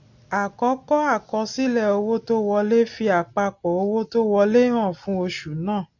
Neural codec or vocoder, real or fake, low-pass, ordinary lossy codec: none; real; 7.2 kHz; none